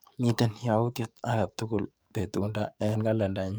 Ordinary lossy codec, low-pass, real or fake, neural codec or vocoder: none; none; fake; codec, 44.1 kHz, 7.8 kbps, DAC